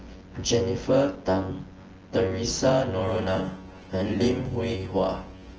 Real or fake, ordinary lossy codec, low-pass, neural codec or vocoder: fake; Opus, 16 kbps; 7.2 kHz; vocoder, 24 kHz, 100 mel bands, Vocos